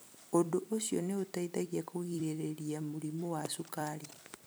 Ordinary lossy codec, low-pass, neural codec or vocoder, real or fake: none; none; vocoder, 44.1 kHz, 128 mel bands every 256 samples, BigVGAN v2; fake